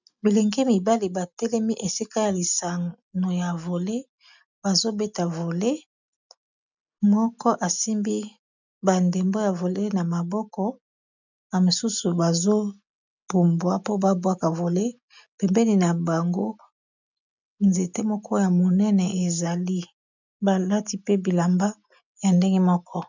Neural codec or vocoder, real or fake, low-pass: none; real; 7.2 kHz